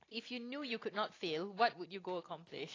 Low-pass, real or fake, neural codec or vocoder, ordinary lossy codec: 7.2 kHz; real; none; AAC, 32 kbps